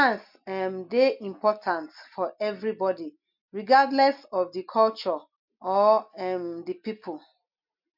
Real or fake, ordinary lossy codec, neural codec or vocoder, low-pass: real; MP3, 48 kbps; none; 5.4 kHz